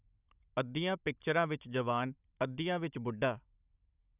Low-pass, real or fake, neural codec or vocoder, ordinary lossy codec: 3.6 kHz; fake; codec, 16 kHz, 16 kbps, FunCodec, trained on Chinese and English, 50 frames a second; none